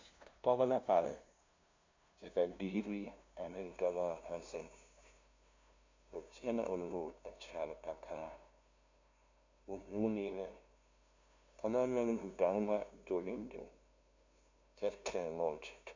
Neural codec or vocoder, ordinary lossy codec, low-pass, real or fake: codec, 16 kHz, 0.5 kbps, FunCodec, trained on LibriTTS, 25 frames a second; MP3, 48 kbps; 7.2 kHz; fake